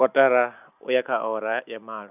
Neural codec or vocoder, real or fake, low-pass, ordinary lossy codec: none; real; 3.6 kHz; none